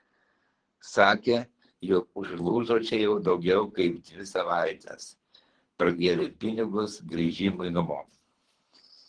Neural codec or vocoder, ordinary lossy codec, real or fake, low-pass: codec, 24 kHz, 3 kbps, HILCodec; Opus, 16 kbps; fake; 9.9 kHz